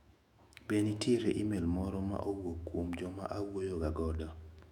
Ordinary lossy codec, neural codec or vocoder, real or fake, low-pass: none; autoencoder, 48 kHz, 128 numbers a frame, DAC-VAE, trained on Japanese speech; fake; 19.8 kHz